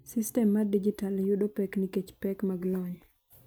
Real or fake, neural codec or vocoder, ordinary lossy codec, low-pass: real; none; none; none